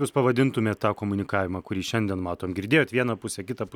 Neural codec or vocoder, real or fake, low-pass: none; real; 19.8 kHz